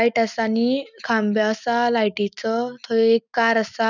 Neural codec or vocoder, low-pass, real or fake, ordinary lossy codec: none; 7.2 kHz; real; none